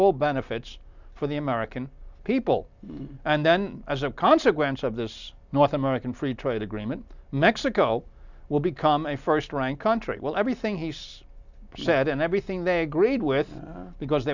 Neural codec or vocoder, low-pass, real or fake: none; 7.2 kHz; real